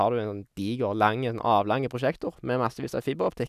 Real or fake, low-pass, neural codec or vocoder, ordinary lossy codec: real; 14.4 kHz; none; none